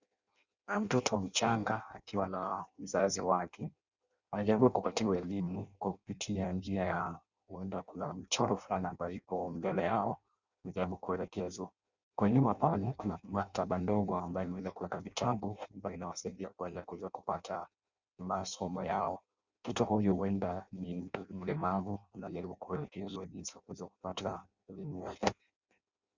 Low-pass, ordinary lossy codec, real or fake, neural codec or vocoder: 7.2 kHz; Opus, 64 kbps; fake; codec, 16 kHz in and 24 kHz out, 0.6 kbps, FireRedTTS-2 codec